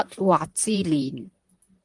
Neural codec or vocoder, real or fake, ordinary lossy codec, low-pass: vocoder, 44.1 kHz, 128 mel bands, Pupu-Vocoder; fake; Opus, 24 kbps; 10.8 kHz